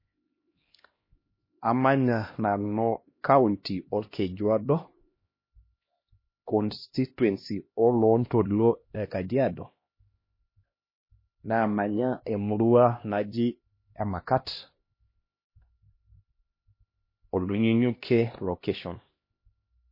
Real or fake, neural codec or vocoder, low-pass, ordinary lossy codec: fake; codec, 16 kHz, 1 kbps, X-Codec, HuBERT features, trained on LibriSpeech; 5.4 kHz; MP3, 24 kbps